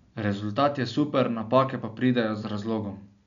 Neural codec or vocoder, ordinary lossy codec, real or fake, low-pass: none; none; real; 7.2 kHz